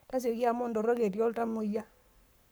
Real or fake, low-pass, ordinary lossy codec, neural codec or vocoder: fake; none; none; codec, 44.1 kHz, 7.8 kbps, Pupu-Codec